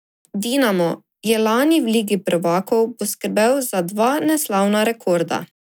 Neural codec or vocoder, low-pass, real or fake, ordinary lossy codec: none; none; real; none